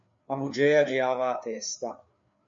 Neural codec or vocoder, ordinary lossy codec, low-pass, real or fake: codec, 16 kHz, 4 kbps, FreqCodec, larger model; MP3, 48 kbps; 7.2 kHz; fake